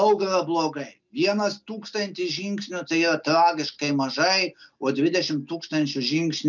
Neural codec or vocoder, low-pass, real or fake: none; 7.2 kHz; real